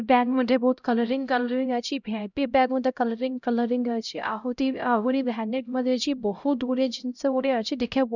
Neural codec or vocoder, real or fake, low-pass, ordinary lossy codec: codec, 16 kHz, 0.5 kbps, X-Codec, HuBERT features, trained on LibriSpeech; fake; 7.2 kHz; none